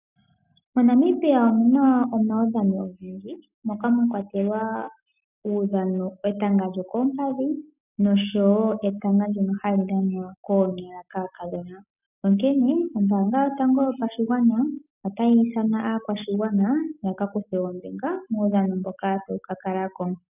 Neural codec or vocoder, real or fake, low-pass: none; real; 3.6 kHz